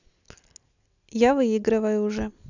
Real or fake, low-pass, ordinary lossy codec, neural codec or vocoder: real; 7.2 kHz; none; none